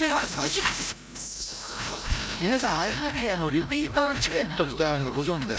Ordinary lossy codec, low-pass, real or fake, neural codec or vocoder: none; none; fake; codec, 16 kHz, 0.5 kbps, FreqCodec, larger model